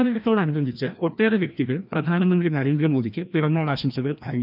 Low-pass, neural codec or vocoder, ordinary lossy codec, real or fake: 5.4 kHz; codec, 16 kHz, 1 kbps, FreqCodec, larger model; none; fake